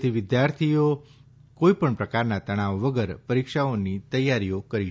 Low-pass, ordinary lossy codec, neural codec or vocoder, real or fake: none; none; none; real